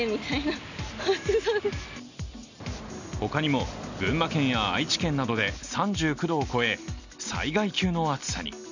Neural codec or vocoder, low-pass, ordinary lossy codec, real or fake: none; 7.2 kHz; none; real